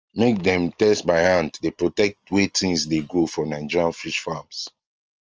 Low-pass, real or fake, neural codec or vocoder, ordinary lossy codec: 7.2 kHz; real; none; Opus, 24 kbps